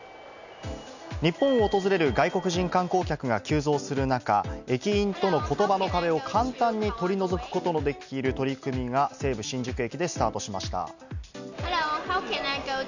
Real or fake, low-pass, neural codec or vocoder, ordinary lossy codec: real; 7.2 kHz; none; none